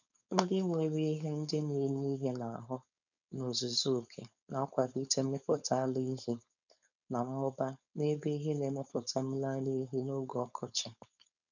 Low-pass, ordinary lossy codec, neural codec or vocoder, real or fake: 7.2 kHz; none; codec, 16 kHz, 4.8 kbps, FACodec; fake